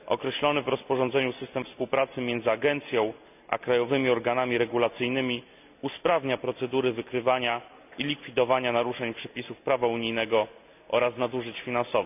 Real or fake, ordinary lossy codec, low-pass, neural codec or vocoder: real; none; 3.6 kHz; none